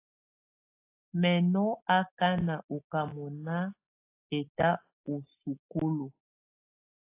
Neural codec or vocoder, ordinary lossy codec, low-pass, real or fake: none; AAC, 24 kbps; 3.6 kHz; real